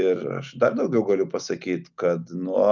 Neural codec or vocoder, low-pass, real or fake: none; 7.2 kHz; real